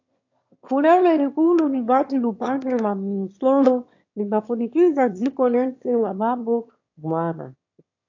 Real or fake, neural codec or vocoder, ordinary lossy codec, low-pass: fake; autoencoder, 22.05 kHz, a latent of 192 numbers a frame, VITS, trained on one speaker; AAC, 48 kbps; 7.2 kHz